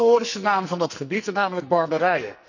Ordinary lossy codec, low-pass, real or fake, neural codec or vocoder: none; 7.2 kHz; fake; codec, 44.1 kHz, 2.6 kbps, SNAC